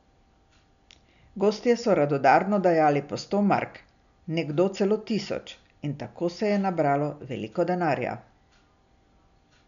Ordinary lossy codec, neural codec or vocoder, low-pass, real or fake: none; none; 7.2 kHz; real